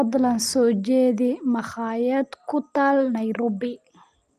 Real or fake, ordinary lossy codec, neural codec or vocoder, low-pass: real; Opus, 32 kbps; none; 14.4 kHz